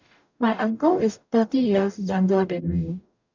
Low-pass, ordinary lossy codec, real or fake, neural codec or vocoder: 7.2 kHz; none; fake; codec, 44.1 kHz, 0.9 kbps, DAC